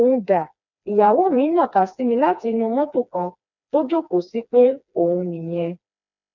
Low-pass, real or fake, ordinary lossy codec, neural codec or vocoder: 7.2 kHz; fake; none; codec, 16 kHz, 2 kbps, FreqCodec, smaller model